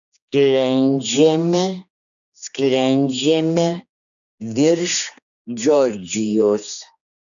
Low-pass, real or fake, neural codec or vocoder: 7.2 kHz; fake; codec, 16 kHz, 2 kbps, X-Codec, HuBERT features, trained on general audio